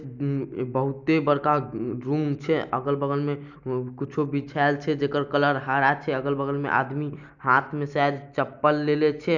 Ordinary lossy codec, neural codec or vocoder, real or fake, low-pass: none; none; real; 7.2 kHz